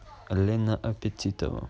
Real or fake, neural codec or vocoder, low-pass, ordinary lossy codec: real; none; none; none